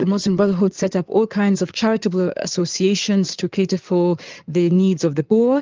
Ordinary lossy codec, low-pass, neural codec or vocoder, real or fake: Opus, 24 kbps; 7.2 kHz; codec, 16 kHz in and 24 kHz out, 2.2 kbps, FireRedTTS-2 codec; fake